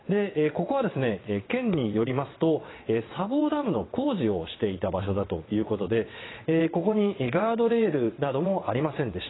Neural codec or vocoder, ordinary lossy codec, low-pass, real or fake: vocoder, 22.05 kHz, 80 mel bands, WaveNeXt; AAC, 16 kbps; 7.2 kHz; fake